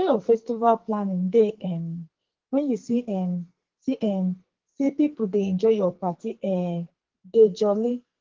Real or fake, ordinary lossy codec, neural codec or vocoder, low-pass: fake; Opus, 16 kbps; codec, 44.1 kHz, 2.6 kbps, SNAC; 7.2 kHz